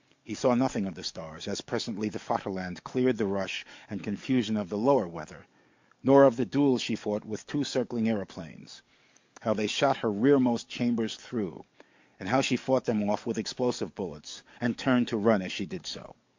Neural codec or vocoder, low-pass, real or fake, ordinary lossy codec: codec, 44.1 kHz, 7.8 kbps, DAC; 7.2 kHz; fake; MP3, 48 kbps